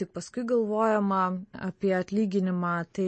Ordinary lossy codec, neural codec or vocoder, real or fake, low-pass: MP3, 32 kbps; none; real; 10.8 kHz